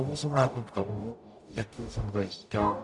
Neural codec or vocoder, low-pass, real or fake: codec, 44.1 kHz, 0.9 kbps, DAC; 10.8 kHz; fake